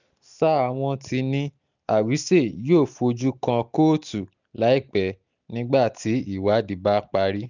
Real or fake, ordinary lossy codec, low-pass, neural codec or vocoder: real; none; 7.2 kHz; none